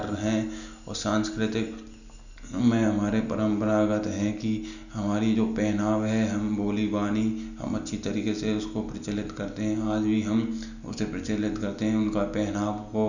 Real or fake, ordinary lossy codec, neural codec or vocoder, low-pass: real; none; none; 7.2 kHz